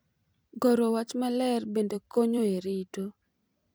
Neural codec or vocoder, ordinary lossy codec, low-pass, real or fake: vocoder, 44.1 kHz, 128 mel bands every 256 samples, BigVGAN v2; none; none; fake